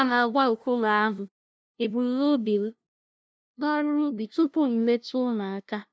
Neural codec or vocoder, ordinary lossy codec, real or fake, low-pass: codec, 16 kHz, 0.5 kbps, FunCodec, trained on LibriTTS, 25 frames a second; none; fake; none